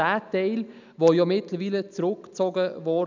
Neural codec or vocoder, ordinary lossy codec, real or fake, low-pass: none; none; real; 7.2 kHz